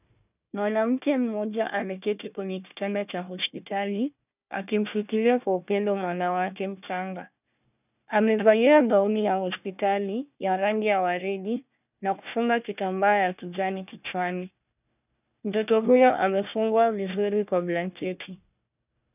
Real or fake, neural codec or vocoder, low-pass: fake; codec, 16 kHz, 1 kbps, FunCodec, trained on Chinese and English, 50 frames a second; 3.6 kHz